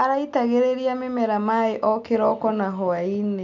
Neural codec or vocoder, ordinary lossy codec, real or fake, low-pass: none; MP3, 64 kbps; real; 7.2 kHz